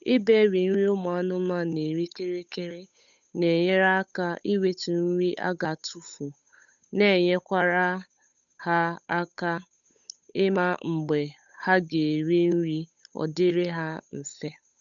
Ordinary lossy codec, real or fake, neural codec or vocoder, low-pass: none; fake; codec, 16 kHz, 8 kbps, FunCodec, trained on Chinese and English, 25 frames a second; 7.2 kHz